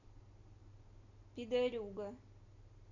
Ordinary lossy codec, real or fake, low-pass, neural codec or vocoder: none; real; 7.2 kHz; none